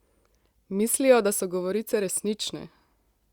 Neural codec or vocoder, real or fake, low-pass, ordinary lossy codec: none; real; 19.8 kHz; Opus, 64 kbps